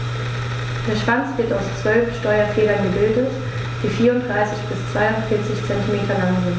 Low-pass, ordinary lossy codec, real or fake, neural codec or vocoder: none; none; real; none